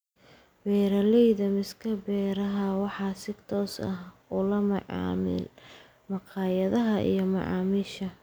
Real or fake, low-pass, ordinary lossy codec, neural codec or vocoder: real; none; none; none